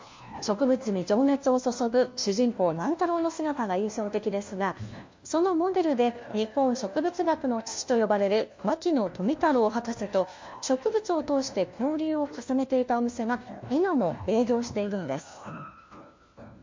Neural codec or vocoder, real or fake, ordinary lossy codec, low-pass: codec, 16 kHz, 1 kbps, FunCodec, trained on LibriTTS, 50 frames a second; fake; MP3, 48 kbps; 7.2 kHz